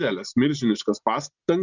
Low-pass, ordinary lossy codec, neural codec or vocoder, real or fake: 7.2 kHz; Opus, 64 kbps; none; real